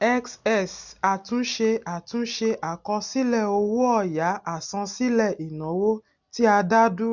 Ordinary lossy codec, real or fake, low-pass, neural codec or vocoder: none; real; 7.2 kHz; none